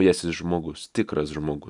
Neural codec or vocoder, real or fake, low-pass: none; real; 10.8 kHz